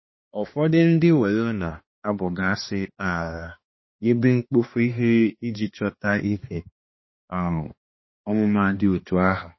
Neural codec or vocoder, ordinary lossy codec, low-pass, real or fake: codec, 16 kHz, 2 kbps, X-Codec, HuBERT features, trained on balanced general audio; MP3, 24 kbps; 7.2 kHz; fake